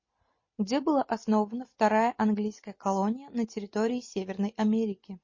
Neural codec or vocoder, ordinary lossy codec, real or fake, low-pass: none; MP3, 32 kbps; real; 7.2 kHz